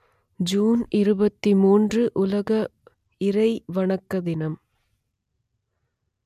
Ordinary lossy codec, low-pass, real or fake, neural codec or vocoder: none; 14.4 kHz; real; none